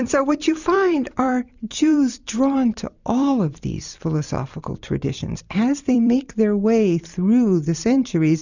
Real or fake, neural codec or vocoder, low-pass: fake; vocoder, 44.1 kHz, 128 mel bands every 256 samples, BigVGAN v2; 7.2 kHz